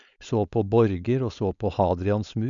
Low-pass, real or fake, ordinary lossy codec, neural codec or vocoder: 7.2 kHz; fake; none; codec, 16 kHz, 4.8 kbps, FACodec